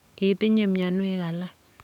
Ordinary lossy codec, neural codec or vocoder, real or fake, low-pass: none; codec, 44.1 kHz, 7.8 kbps, DAC; fake; 19.8 kHz